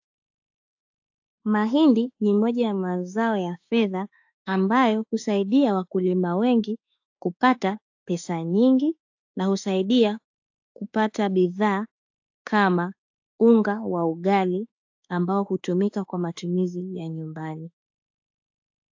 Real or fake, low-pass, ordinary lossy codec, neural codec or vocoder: fake; 7.2 kHz; AAC, 48 kbps; autoencoder, 48 kHz, 32 numbers a frame, DAC-VAE, trained on Japanese speech